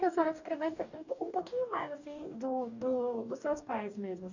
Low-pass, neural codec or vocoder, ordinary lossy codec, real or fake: 7.2 kHz; codec, 44.1 kHz, 2.6 kbps, DAC; none; fake